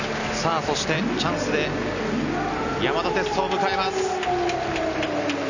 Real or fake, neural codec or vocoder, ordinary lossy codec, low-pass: real; none; none; 7.2 kHz